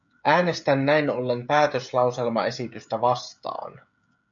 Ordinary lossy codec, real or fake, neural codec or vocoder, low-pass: MP3, 64 kbps; fake; codec, 16 kHz, 16 kbps, FreqCodec, smaller model; 7.2 kHz